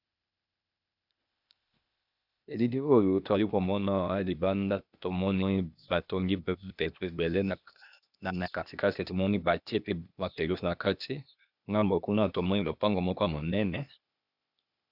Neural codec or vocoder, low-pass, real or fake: codec, 16 kHz, 0.8 kbps, ZipCodec; 5.4 kHz; fake